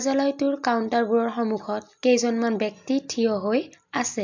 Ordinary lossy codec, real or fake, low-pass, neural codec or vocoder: MP3, 64 kbps; real; 7.2 kHz; none